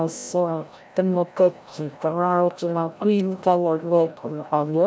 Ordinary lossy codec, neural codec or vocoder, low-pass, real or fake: none; codec, 16 kHz, 0.5 kbps, FreqCodec, larger model; none; fake